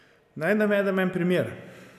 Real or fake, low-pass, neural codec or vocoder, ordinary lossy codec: real; 14.4 kHz; none; none